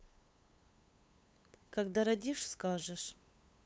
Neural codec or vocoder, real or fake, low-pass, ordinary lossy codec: codec, 16 kHz, 8 kbps, FunCodec, trained on LibriTTS, 25 frames a second; fake; none; none